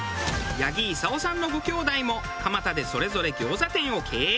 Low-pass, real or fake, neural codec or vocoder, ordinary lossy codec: none; real; none; none